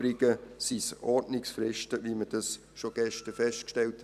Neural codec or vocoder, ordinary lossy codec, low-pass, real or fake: vocoder, 44.1 kHz, 128 mel bands every 256 samples, BigVGAN v2; none; 14.4 kHz; fake